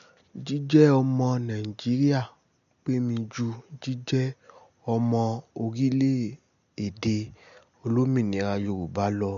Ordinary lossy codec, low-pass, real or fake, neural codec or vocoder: none; 7.2 kHz; real; none